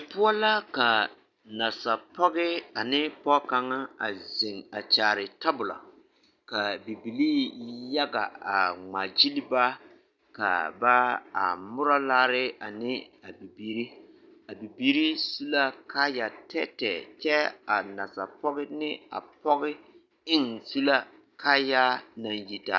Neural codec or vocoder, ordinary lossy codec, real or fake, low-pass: none; Opus, 64 kbps; real; 7.2 kHz